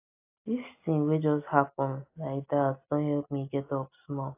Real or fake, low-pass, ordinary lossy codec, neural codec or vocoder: real; 3.6 kHz; AAC, 24 kbps; none